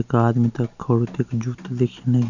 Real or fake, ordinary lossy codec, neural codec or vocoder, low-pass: real; none; none; 7.2 kHz